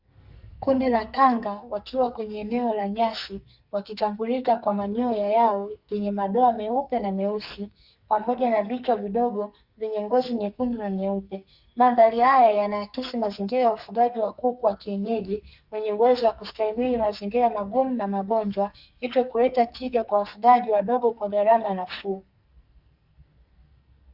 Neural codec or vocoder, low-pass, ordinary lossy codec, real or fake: codec, 44.1 kHz, 2.6 kbps, SNAC; 5.4 kHz; Opus, 64 kbps; fake